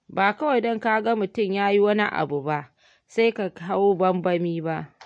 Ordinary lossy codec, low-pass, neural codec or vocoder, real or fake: MP3, 48 kbps; 9.9 kHz; none; real